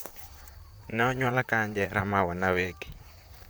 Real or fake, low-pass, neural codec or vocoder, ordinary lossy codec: fake; none; vocoder, 44.1 kHz, 128 mel bands, Pupu-Vocoder; none